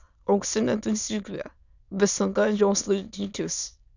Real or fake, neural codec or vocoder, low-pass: fake; autoencoder, 22.05 kHz, a latent of 192 numbers a frame, VITS, trained on many speakers; 7.2 kHz